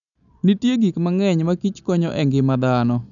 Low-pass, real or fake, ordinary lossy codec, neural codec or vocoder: 7.2 kHz; real; none; none